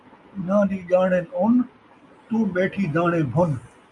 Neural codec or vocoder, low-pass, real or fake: none; 10.8 kHz; real